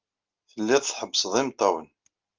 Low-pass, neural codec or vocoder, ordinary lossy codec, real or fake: 7.2 kHz; none; Opus, 32 kbps; real